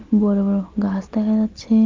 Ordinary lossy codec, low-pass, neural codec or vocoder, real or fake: Opus, 32 kbps; 7.2 kHz; none; real